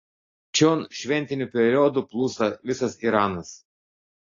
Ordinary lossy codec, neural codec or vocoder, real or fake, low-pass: AAC, 32 kbps; none; real; 7.2 kHz